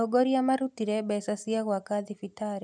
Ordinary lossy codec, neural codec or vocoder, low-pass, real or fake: none; none; 9.9 kHz; real